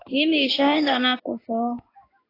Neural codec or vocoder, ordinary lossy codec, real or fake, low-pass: codec, 16 kHz in and 24 kHz out, 1 kbps, XY-Tokenizer; AAC, 24 kbps; fake; 5.4 kHz